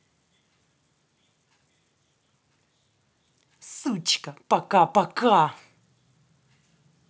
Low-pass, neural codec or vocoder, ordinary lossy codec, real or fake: none; none; none; real